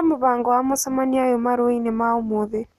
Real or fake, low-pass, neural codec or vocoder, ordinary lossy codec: real; 14.4 kHz; none; none